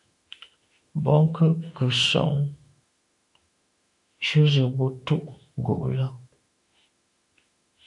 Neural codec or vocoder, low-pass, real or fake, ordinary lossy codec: autoencoder, 48 kHz, 32 numbers a frame, DAC-VAE, trained on Japanese speech; 10.8 kHz; fake; MP3, 64 kbps